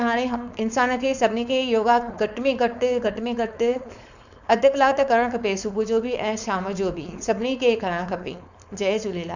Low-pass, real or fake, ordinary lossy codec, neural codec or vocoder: 7.2 kHz; fake; none; codec, 16 kHz, 4.8 kbps, FACodec